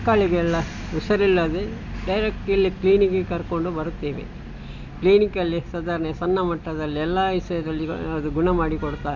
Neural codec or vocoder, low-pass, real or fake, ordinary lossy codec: none; 7.2 kHz; real; none